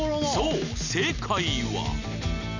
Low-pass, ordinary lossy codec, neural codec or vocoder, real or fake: 7.2 kHz; none; none; real